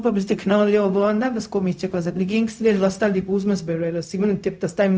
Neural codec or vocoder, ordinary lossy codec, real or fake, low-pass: codec, 16 kHz, 0.4 kbps, LongCat-Audio-Codec; none; fake; none